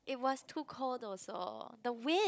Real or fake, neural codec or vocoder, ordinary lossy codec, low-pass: fake; codec, 16 kHz, 16 kbps, FunCodec, trained on Chinese and English, 50 frames a second; none; none